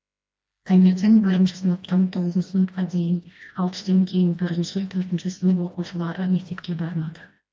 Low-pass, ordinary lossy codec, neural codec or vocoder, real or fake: none; none; codec, 16 kHz, 1 kbps, FreqCodec, smaller model; fake